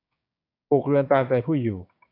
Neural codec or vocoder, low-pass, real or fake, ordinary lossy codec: codec, 16 kHz, 6 kbps, DAC; 5.4 kHz; fake; AAC, 32 kbps